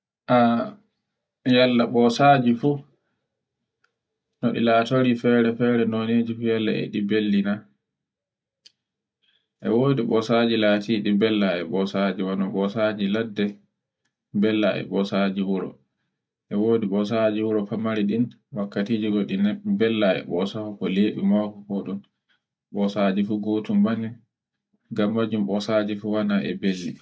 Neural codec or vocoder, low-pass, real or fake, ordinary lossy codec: none; none; real; none